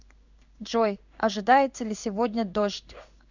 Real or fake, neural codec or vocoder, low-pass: fake; codec, 16 kHz in and 24 kHz out, 1 kbps, XY-Tokenizer; 7.2 kHz